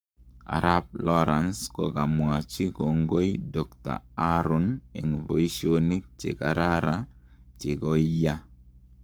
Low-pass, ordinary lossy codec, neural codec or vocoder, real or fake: none; none; codec, 44.1 kHz, 7.8 kbps, Pupu-Codec; fake